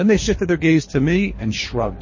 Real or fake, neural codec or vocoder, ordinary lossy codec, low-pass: fake; codec, 24 kHz, 3 kbps, HILCodec; MP3, 32 kbps; 7.2 kHz